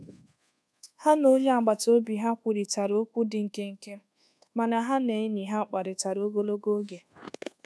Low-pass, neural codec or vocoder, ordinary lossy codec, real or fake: none; codec, 24 kHz, 1.2 kbps, DualCodec; none; fake